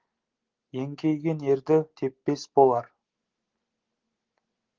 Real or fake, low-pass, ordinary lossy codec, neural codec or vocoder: real; 7.2 kHz; Opus, 16 kbps; none